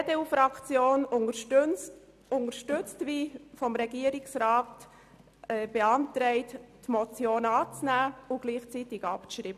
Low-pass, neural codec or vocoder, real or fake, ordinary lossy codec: 14.4 kHz; none; real; none